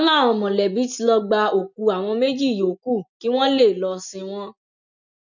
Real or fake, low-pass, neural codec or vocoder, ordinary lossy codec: real; 7.2 kHz; none; none